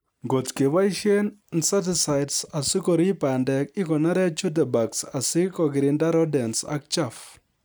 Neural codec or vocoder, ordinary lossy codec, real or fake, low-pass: none; none; real; none